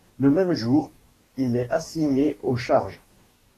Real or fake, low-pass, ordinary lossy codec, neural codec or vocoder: fake; 14.4 kHz; AAC, 48 kbps; codec, 44.1 kHz, 2.6 kbps, DAC